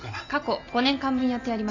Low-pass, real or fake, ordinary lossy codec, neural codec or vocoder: 7.2 kHz; real; none; none